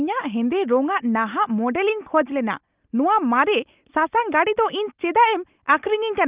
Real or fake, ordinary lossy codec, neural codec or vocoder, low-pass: real; Opus, 24 kbps; none; 3.6 kHz